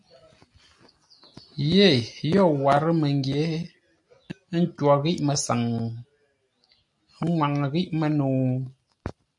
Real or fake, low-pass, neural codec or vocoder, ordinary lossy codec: real; 10.8 kHz; none; AAC, 64 kbps